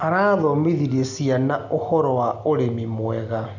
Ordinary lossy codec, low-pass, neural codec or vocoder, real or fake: none; 7.2 kHz; none; real